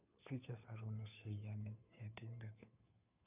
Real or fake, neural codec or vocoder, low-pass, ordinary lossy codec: fake; codec, 16 kHz, 2 kbps, FunCodec, trained on Chinese and English, 25 frames a second; 3.6 kHz; none